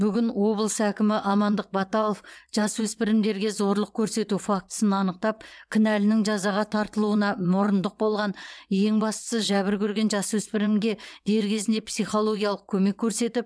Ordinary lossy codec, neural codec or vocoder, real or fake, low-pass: none; vocoder, 22.05 kHz, 80 mel bands, WaveNeXt; fake; none